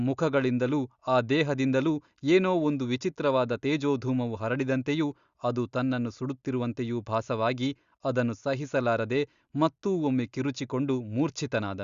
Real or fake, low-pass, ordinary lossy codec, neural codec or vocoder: real; 7.2 kHz; Opus, 64 kbps; none